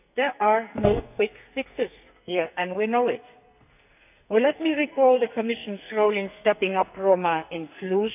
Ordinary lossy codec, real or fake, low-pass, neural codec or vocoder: none; fake; 3.6 kHz; codec, 44.1 kHz, 2.6 kbps, SNAC